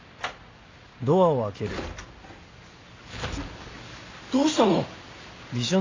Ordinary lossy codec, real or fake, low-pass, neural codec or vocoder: AAC, 32 kbps; real; 7.2 kHz; none